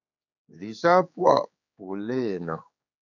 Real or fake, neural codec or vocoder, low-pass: fake; codec, 16 kHz, 4 kbps, X-Codec, HuBERT features, trained on general audio; 7.2 kHz